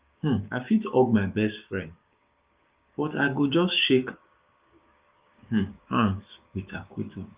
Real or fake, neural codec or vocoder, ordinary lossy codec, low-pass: fake; codec, 16 kHz, 6 kbps, DAC; Opus, 24 kbps; 3.6 kHz